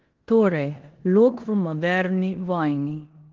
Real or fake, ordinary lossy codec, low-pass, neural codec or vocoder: fake; Opus, 16 kbps; 7.2 kHz; codec, 16 kHz in and 24 kHz out, 0.9 kbps, LongCat-Audio-Codec, four codebook decoder